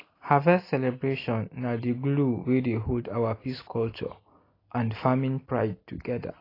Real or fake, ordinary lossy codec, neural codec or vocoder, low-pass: real; AAC, 24 kbps; none; 5.4 kHz